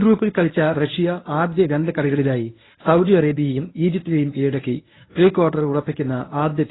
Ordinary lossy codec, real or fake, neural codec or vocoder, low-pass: AAC, 16 kbps; fake; codec, 24 kHz, 0.9 kbps, WavTokenizer, medium speech release version 1; 7.2 kHz